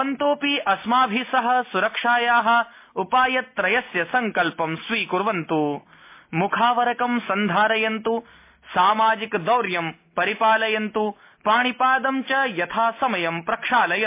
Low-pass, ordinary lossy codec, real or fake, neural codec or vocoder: 3.6 kHz; MP3, 24 kbps; real; none